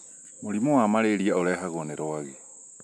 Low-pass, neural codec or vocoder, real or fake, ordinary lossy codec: none; none; real; none